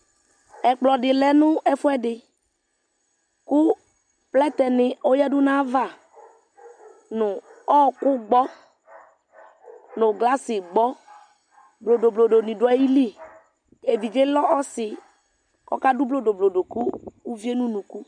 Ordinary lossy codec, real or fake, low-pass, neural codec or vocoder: MP3, 96 kbps; real; 9.9 kHz; none